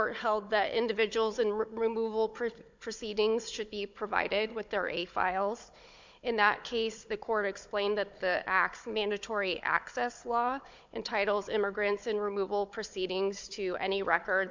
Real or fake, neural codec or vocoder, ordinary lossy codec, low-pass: fake; codec, 16 kHz, 16 kbps, FunCodec, trained on Chinese and English, 50 frames a second; MP3, 64 kbps; 7.2 kHz